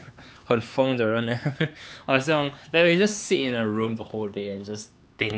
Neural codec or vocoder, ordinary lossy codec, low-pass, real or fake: codec, 16 kHz, 4 kbps, X-Codec, HuBERT features, trained on LibriSpeech; none; none; fake